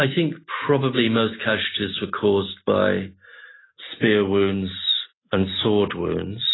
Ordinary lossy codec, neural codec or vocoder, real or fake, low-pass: AAC, 16 kbps; none; real; 7.2 kHz